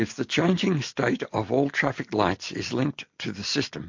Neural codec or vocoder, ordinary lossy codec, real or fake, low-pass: none; MP3, 48 kbps; real; 7.2 kHz